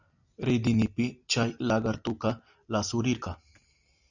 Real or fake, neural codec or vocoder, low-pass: real; none; 7.2 kHz